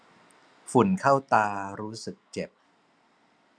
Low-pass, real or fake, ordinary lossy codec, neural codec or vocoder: none; real; none; none